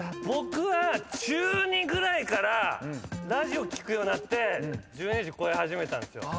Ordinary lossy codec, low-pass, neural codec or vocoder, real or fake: none; none; none; real